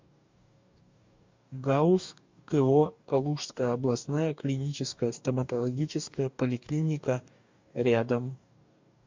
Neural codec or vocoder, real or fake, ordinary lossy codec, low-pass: codec, 44.1 kHz, 2.6 kbps, DAC; fake; MP3, 64 kbps; 7.2 kHz